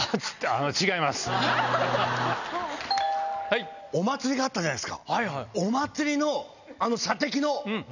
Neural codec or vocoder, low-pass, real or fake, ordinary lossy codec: none; 7.2 kHz; real; none